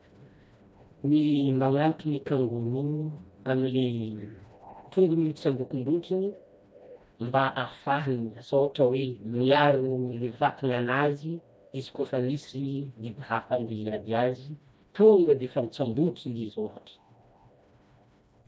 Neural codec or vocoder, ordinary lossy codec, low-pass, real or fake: codec, 16 kHz, 1 kbps, FreqCodec, smaller model; none; none; fake